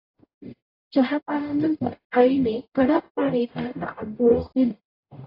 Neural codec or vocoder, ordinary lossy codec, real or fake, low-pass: codec, 44.1 kHz, 0.9 kbps, DAC; AAC, 24 kbps; fake; 5.4 kHz